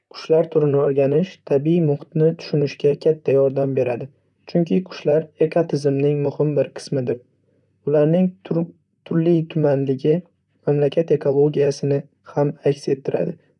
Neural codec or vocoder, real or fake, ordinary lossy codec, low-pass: vocoder, 44.1 kHz, 128 mel bands, Pupu-Vocoder; fake; none; 10.8 kHz